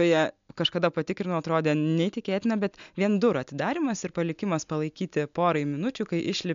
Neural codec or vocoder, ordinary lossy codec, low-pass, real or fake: none; MP3, 64 kbps; 7.2 kHz; real